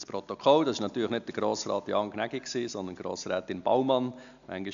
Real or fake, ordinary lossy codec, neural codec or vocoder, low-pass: real; AAC, 96 kbps; none; 7.2 kHz